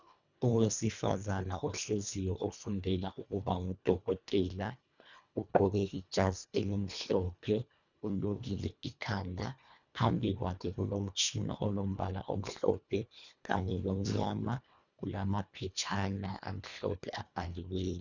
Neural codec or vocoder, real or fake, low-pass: codec, 24 kHz, 1.5 kbps, HILCodec; fake; 7.2 kHz